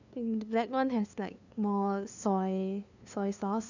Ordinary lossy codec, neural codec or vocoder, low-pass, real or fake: none; codec, 16 kHz, 2 kbps, FunCodec, trained on LibriTTS, 25 frames a second; 7.2 kHz; fake